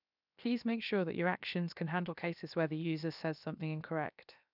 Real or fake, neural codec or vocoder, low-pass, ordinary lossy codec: fake; codec, 16 kHz, 0.7 kbps, FocalCodec; 5.4 kHz; none